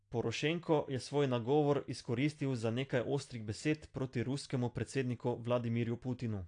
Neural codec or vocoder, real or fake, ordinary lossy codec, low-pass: none; real; AAC, 48 kbps; 9.9 kHz